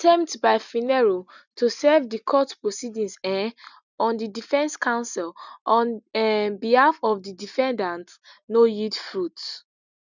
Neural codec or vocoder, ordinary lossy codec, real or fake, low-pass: none; none; real; 7.2 kHz